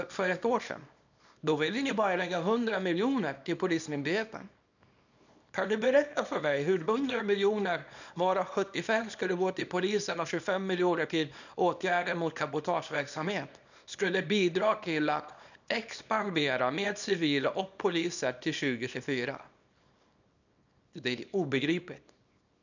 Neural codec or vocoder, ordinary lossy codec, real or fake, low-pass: codec, 24 kHz, 0.9 kbps, WavTokenizer, small release; none; fake; 7.2 kHz